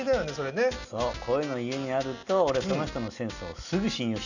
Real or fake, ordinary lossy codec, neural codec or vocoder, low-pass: real; none; none; 7.2 kHz